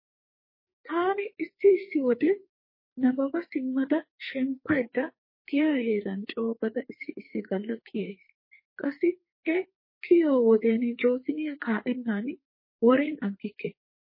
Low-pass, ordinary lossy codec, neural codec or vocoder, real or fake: 5.4 kHz; MP3, 24 kbps; codec, 44.1 kHz, 2.6 kbps, SNAC; fake